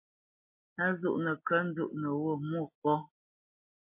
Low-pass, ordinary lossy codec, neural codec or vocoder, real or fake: 3.6 kHz; MP3, 24 kbps; none; real